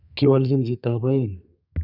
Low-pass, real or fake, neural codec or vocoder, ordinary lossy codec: 5.4 kHz; fake; codec, 32 kHz, 1.9 kbps, SNAC; none